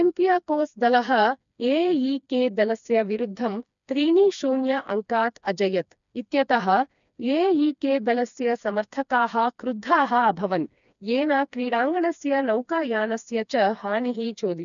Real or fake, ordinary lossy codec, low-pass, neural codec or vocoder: fake; none; 7.2 kHz; codec, 16 kHz, 2 kbps, FreqCodec, smaller model